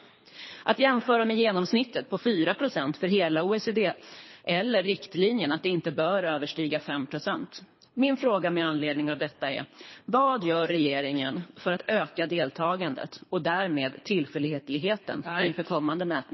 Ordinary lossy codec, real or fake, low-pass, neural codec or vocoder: MP3, 24 kbps; fake; 7.2 kHz; codec, 24 kHz, 3 kbps, HILCodec